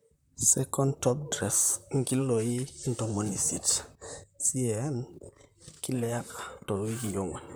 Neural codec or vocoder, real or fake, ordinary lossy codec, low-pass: vocoder, 44.1 kHz, 128 mel bands, Pupu-Vocoder; fake; none; none